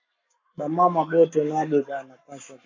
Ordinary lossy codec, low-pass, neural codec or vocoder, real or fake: MP3, 64 kbps; 7.2 kHz; codec, 44.1 kHz, 7.8 kbps, Pupu-Codec; fake